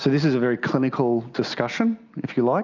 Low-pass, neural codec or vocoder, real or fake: 7.2 kHz; none; real